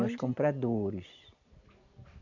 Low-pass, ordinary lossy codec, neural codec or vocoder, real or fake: 7.2 kHz; none; none; real